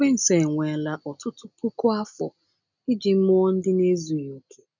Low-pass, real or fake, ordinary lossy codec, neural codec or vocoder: 7.2 kHz; real; none; none